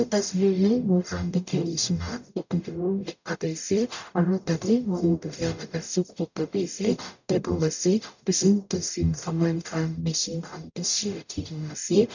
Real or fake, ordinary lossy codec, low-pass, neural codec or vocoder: fake; none; 7.2 kHz; codec, 44.1 kHz, 0.9 kbps, DAC